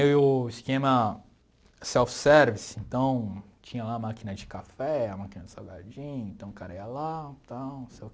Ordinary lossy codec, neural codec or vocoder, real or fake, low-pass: none; none; real; none